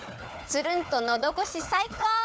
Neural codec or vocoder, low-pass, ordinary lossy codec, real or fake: codec, 16 kHz, 4 kbps, FunCodec, trained on Chinese and English, 50 frames a second; none; none; fake